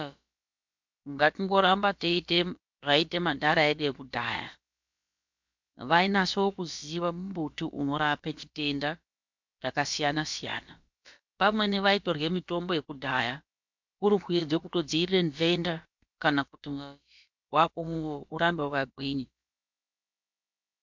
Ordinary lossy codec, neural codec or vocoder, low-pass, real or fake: MP3, 64 kbps; codec, 16 kHz, about 1 kbps, DyCAST, with the encoder's durations; 7.2 kHz; fake